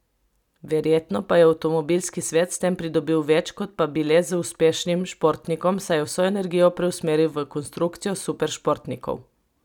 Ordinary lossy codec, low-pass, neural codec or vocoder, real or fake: none; 19.8 kHz; none; real